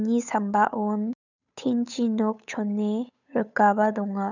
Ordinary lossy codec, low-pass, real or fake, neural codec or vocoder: none; 7.2 kHz; fake; codec, 16 kHz, 8 kbps, FunCodec, trained on Chinese and English, 25 frames a second